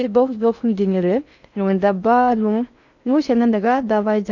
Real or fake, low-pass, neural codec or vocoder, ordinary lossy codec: fake; 7.2 kHz; codec, 16 kHz in and 24 kHz out, 0.6 kbps, FocalCodec, streaming, 4096 codes; none